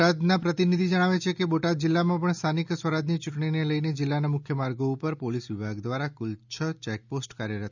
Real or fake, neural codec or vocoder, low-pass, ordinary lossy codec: real; none; 7.2 kHz; none